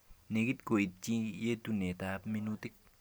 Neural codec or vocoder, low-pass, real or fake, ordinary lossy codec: none; none; real; none